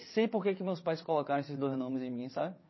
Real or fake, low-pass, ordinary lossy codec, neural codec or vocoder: fake; 7.2 kHz; MP3, 24 kbps; vocoder, 22.05 kHz, 80 mel bands, WaveNeXt